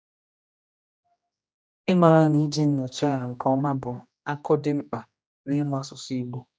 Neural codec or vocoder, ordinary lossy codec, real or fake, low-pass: codec, 16 kHz, 1 kbps, X-Codec, HuBERT features, trained on general audio; none; fake; none